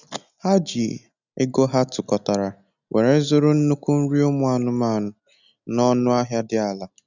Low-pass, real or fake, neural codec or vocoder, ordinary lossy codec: 7.2 kHz; real; none; none